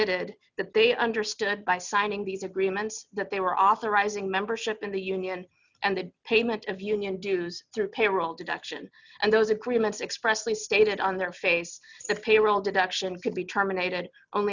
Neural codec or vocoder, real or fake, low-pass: none; real; 7.2 kHz